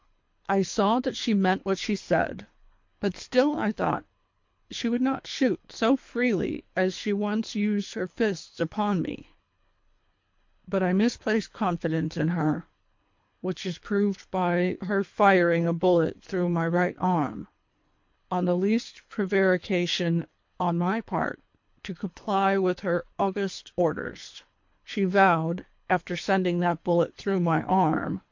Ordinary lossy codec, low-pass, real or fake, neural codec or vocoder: MP3, 48 kbps; 7.2 kHz; fake; codec, 24 kHz, 3 kbps, HILCodec